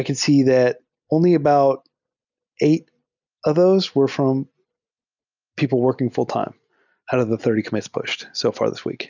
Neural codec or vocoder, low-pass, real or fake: none; 7.2 kHz; real